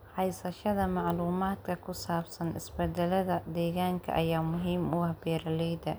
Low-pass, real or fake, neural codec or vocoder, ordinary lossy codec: none; real; none; none